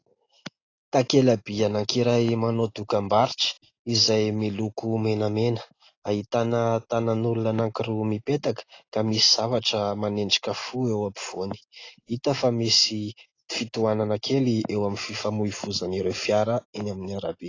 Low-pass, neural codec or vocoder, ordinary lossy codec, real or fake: 7.2 kHz; none; AAC, 32 kbps; real